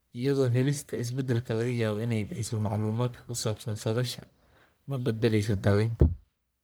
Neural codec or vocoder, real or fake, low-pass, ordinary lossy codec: codec, 44.1 kHz, 1.7 kbps, Pupu-Codec; fake; none; none